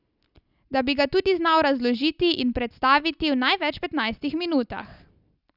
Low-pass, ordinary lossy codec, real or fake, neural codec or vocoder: 5.4 kHz; none; real; none